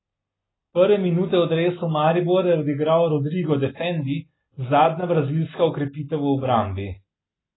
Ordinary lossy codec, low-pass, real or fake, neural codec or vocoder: AAC, 16 kbps; 7.2 kHz; real; none